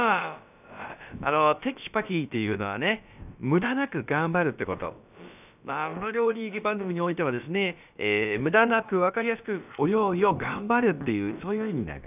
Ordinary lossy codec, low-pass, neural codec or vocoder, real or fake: none; 3.6 kHz; codec, 16 kHz, about 1 kbps, DyCAST, with the encoder's durations; fake